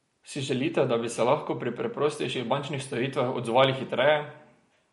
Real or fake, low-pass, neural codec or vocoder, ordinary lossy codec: real; 19.8 kHz; none; MP3, 48 kbps